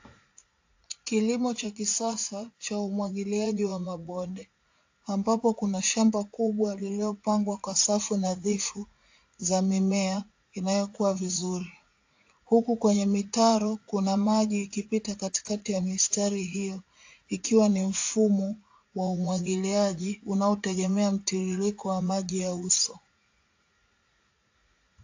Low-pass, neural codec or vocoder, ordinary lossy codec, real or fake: 7.2 kHz; vocoder, 44.1 kHz, 80 mel bands, Vocos; AAC, 48 kbps; fake